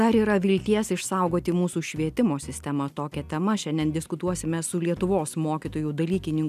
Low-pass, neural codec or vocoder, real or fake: 14.4 kHz; none; real